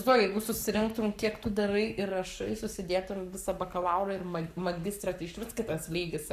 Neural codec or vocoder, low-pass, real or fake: codec, 44.1 kHz, 7.8 kbps, Pupu-Codec; 14.4 kHz; fake